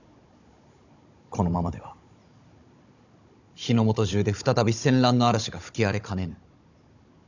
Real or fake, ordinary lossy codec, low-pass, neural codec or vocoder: fake; none; 7.2 kHz; codec, 16 kHz, 16 kbps, FunCodec, trained on Chinese and English, 50 frames a second